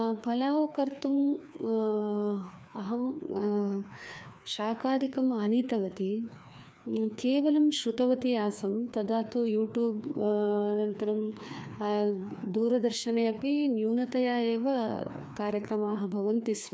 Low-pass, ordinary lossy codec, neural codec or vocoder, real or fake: none; none; codec, 16 kHz, 2 kbps, FreqCodec, larger model; fake